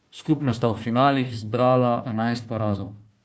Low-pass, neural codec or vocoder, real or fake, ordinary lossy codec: none; codec, 16 kHz, 1 kbps, FunCodec, trained on Chinese and English, 50 frames a second; fake; none